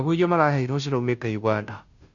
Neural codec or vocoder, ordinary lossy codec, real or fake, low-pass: codec, 16 kHz, 0.5 kbps, FunCodec, trained on Chinese and English, 25 frames a second; none; fake; 7.2 kHz